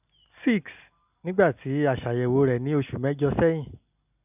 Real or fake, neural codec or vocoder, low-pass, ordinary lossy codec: real; none; 3.6 kHz; none